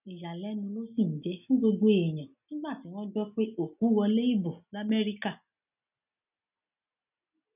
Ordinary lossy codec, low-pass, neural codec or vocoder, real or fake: none; 3.6 kHz; none; real